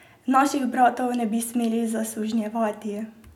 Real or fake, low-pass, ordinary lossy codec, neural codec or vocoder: real; 19.8 kHz; none; none